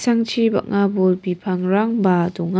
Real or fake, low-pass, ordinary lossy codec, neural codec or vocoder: real; none; none; none